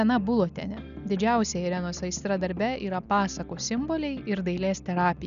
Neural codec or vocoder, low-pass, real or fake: none; 7.2 kHz; real